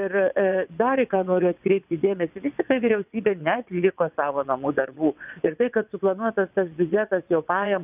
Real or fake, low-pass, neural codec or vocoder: fake; 3.6 kHz; vocoder, 22.05 kHz, 80 mel bands, WaveNeXt